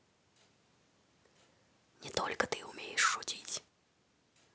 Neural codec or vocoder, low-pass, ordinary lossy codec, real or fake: none; none; none; real